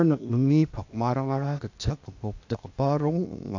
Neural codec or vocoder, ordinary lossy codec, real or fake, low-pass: codec, 16 kHz, 0.8 kbps, ZipCodec; AAC, 48 kbps; fake; 7.2 kHz